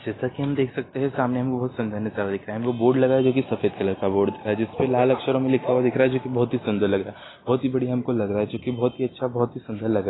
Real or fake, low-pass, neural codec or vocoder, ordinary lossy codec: real; 7.2 kHz; none; AAC, 16 kbps